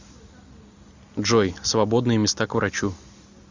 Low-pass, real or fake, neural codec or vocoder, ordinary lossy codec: 7.2 kHz; real; none; Opus, 64 kbps